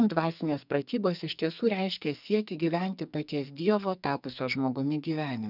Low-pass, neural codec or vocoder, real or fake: 5.4 kHz; codec, 44.1 kHz, 2.6 kbps, SNAC; fake